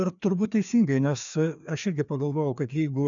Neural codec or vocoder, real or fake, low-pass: codec, 16 kHz, 2 kbps, FreqCodec, larger model; fake; 7.2 kHz